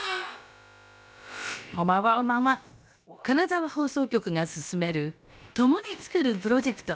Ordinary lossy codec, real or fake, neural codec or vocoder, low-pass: none; fake; codec, 16 kHz, about 1 kbps, DyCAST, with the encoder's durations; none